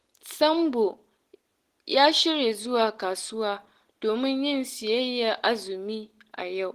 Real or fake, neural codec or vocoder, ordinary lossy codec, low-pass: real; none; Opus, 16 kbps; 14.4 kHz